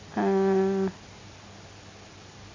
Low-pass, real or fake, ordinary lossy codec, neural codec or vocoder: 7.2 kHz; real; AAC, 32 kbps; none